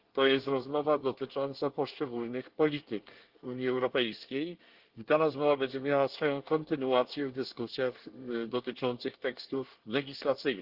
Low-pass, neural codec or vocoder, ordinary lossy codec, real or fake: 5.4 kHz; codec, 24 kHz, 1 kbps, SNAC; Opus, 16 kbps; fake